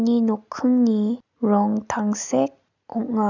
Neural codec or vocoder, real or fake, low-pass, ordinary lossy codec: none; real; 7.2 kHz; none